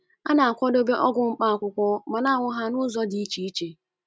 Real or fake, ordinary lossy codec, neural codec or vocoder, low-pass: real; none; none; none